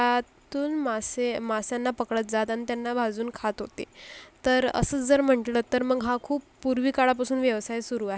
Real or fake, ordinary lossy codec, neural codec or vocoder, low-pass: real; none; none; none